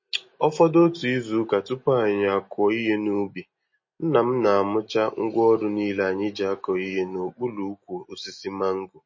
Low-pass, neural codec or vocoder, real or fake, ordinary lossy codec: 7.2 kHz; none; real; MP3, 32 kbps